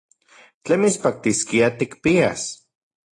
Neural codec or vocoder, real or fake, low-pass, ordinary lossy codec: none; real; 10.8 kHz; AAC, 32 kbps